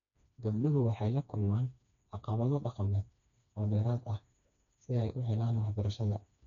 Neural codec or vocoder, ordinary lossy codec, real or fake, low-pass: codec, 16 kHz, 2 kbps, FreqCodec, smaller model; none; fake; 7.2 kHz